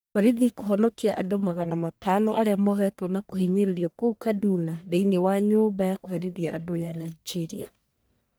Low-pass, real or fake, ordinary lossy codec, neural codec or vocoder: none; fake; none; codec, 44.1 kHz, 1.7 kbps, Pupu-Codec